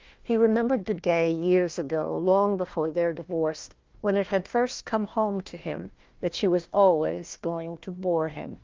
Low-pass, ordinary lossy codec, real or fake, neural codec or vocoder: 7.2 kHz; Opus, 32 kbps; fake; codec, 16 kHz, 1 kbps, FunCodec, trained on Chinese and English, 50 frames a second